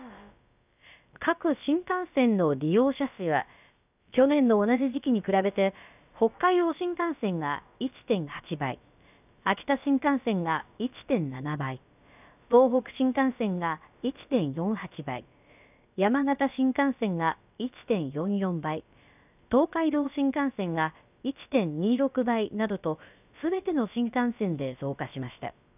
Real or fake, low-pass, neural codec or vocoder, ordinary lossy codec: fake; 3.6 kHz; codec, 16 kHz, about 1 kbps, DyCAST, with the encoder's durations; none